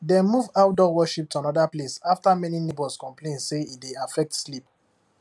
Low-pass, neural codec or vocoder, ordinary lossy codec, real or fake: none; none; none; real